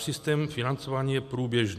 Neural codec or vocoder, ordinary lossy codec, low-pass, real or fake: none; MP3, 96 kbps; 14.4 kHz; real